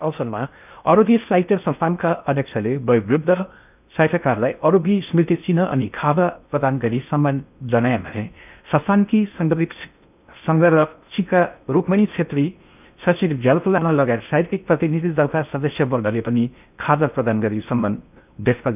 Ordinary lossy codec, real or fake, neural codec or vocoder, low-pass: none; fake; codec, 16 kHz in and 24 kHz out, 0.6 kbps, FocalCodec, streaming, 2048 codes; 3.6 kHz